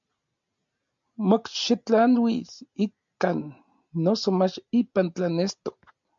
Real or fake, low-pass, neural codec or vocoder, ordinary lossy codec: real; 7.2 kHz; none; MP3, 48 kbps